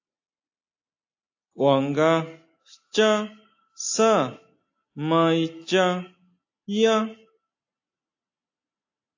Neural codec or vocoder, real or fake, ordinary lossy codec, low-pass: none; real; AAC, 48 kbps; 7.2 kHz